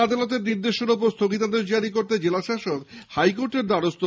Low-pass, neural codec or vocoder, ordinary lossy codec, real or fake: none; none; none; real